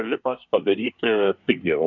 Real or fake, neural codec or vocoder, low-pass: fake; codec, 24 kHz, 1 kbps, SNAC; 7.2 kHz